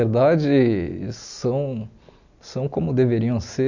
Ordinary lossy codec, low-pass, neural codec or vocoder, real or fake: none; 7.2 kHz; none; real